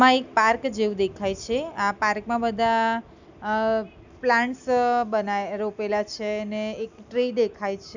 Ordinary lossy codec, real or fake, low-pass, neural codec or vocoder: none; real; 7.2 kHz; none